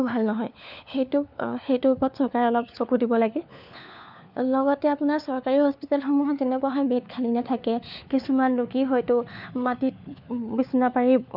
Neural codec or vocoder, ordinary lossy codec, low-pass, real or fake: codec, 16 kHz, 4 kbps, FunCodec, trained on LibriTTS, 50 frames a second; none; 5.4 kHz; fake